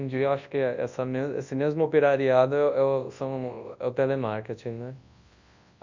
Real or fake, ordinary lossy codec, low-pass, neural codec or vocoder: fake; MP3, 64 kbps; 7.2 kHz; codec, 24 kHz, 0.9 kbps, WavTokenizer, large speech release